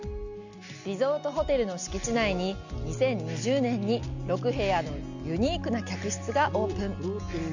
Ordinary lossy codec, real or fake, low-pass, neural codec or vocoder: none; real; 7.2 kHz; none